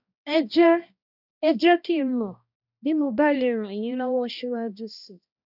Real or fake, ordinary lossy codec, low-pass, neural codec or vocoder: fake; none; 5.4 kHz; codec, 16 kHz, 1 kbps, X-Codec, HuBERT features, trained on balanced general audio